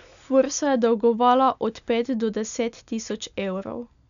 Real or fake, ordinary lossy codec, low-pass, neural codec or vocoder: real; none; 7.2 kHz; none